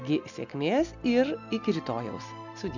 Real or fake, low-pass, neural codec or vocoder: real; 7.2 kHz; none